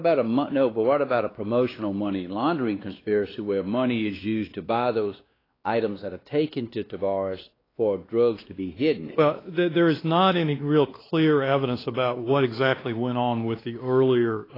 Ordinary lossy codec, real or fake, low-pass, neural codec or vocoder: AAC, 24 kbps; fake; 5.4 kHz; codec, 16 kHz, 2 kbps, X-Codec, WavLM features, trained on Multilingual LibriSpeech